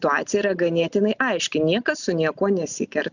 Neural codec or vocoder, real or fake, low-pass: none; real; 7.2 kHz